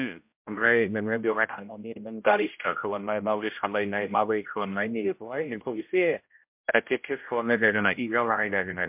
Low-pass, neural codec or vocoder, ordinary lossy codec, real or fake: 3.6 kHz; codec, 16 kHz, 0.5 kbps, X-Codec, HuBERT features, trained on general audio; MP3, 32 kbps; fake